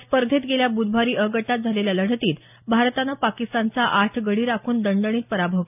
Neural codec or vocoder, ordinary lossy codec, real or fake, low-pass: none; none; real; 3.6 kHz